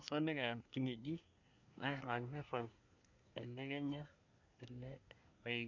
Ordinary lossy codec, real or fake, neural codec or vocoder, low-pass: none; fake; codec, 24 kHz, 1 kbps, SNAC; 7.2 kHz